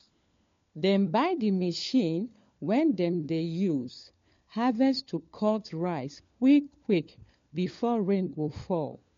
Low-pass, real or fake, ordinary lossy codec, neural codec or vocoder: 7.2 kHz; fake; MP3, 48 kbps; codec, 16 kHz, 4 kbps, FunCodec, trained on LibriTTS, 50 frames a second